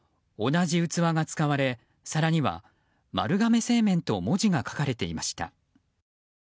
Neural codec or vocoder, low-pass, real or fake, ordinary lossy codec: none; none; real; none